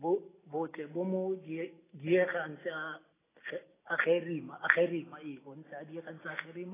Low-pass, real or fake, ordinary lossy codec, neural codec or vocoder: 3.6 kHz; real; AAC, 16 kbps; none